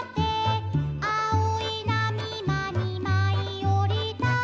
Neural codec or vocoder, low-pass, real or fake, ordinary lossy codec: none; none; real; none